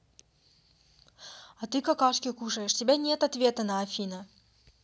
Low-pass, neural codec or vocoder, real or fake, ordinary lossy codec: none; none; real; none